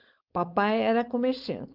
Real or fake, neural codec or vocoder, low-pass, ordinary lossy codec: fake; codec, 16 kHz, 4.8 kbps, FACodec; 5.4 kHz; Opus, 32 kbps